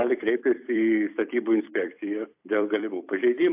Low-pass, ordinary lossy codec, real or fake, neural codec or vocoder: 3.6 kHz; AAC, 32 kbps; fake; vocoder, 44.1 kHz, 128 mel bands every 256 samples, BigVGAN v2